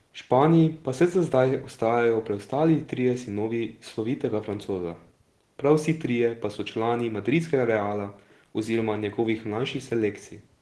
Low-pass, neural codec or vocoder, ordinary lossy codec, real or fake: 10.8 kHz; none; Opus, 16 kbps; real